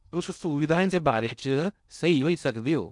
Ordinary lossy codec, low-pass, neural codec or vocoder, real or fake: none; 10.8 kHz; codec, 16 kHz in and 24 kHz out, 0.6 kbps, FocalCodec, streaming, 2048 codes; fake